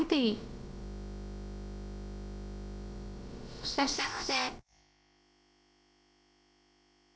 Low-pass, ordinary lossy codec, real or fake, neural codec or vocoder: none; none; fake; codec, 16 kHz, about 1 kbps, DyCAST, with the encoder's durations